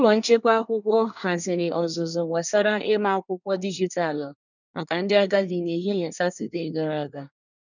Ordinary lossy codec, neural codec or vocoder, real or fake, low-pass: none; codec, 24 kHz, 1 kbps, SNAC; fake; 7.2 kHz